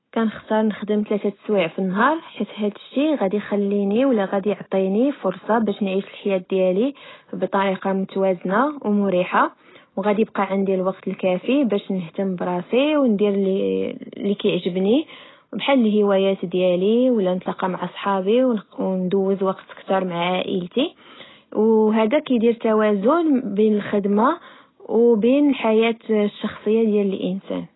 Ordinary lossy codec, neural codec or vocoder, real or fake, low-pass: AAC, 16 kbps; none; real; 7.2 kHz